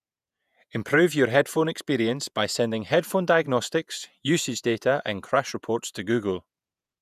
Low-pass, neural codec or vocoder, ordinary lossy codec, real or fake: 14.4 kHz; vocoder, 48 kHz, 128 mel bands, Vocos; none; fake